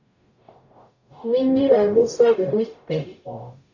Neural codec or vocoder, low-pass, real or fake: codec, 44.1 kHz, 0.9 kbps, DAC; 7.2 kHz; fake